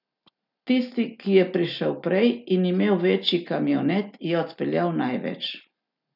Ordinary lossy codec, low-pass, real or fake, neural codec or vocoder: none; 5.4 kHz; real; none